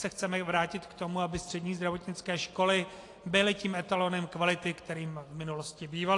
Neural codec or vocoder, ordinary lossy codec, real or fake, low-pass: none; AAC, 48 kbps; real; 10.8 kHz